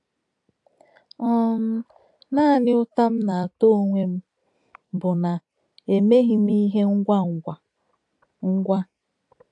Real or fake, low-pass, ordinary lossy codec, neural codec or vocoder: fake; 10.8 kHz; AAC, 64 kbps; vocoder, 44.1 kHz, 128 mel bands every 256 samples, BigVGAN v2